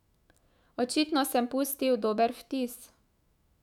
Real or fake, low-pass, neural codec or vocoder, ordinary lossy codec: fake; 19.8 kHz; autoencoder, 48 kHz, 128 numbers a frame, DAC-VAE, trained on Japanese speech; none